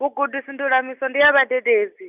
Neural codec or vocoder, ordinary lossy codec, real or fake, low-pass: none; none; real; 3.6 kHz